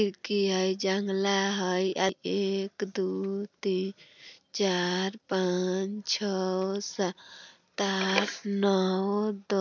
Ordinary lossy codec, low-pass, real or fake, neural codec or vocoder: none; 7.2 kHz; real; none